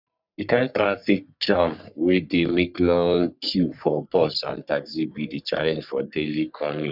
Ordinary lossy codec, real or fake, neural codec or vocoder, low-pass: none; fake; codec, 44.1 kHz, 3.4 kbps, Pupu-Codec; 5.4 kHz